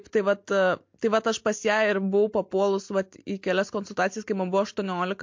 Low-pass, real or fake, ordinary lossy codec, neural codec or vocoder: 7.2 kHz; real; MP3, 48 kbps; none